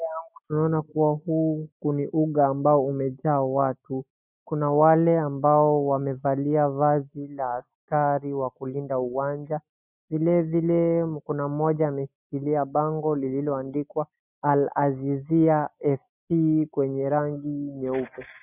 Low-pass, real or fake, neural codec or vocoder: 3.6 kHz; real; none